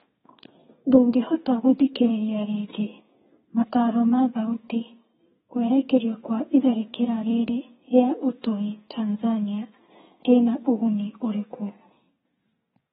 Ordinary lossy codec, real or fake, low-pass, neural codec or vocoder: AAC, 16 kbps; fake; 14.4 kHz; codec, 32 kHz, 1.9 kbps, SNAC